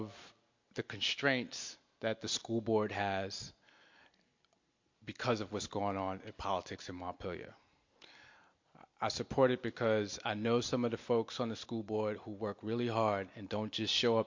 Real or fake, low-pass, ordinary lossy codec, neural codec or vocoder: real; 7.2 kHz; MP3, 48 kbps; none